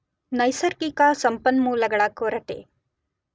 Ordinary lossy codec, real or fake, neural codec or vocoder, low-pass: none; real; none; none